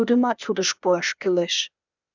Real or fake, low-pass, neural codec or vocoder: fake; 7.2 kHz; codec, 16 kHz, 0.8 kbps, ZipCodec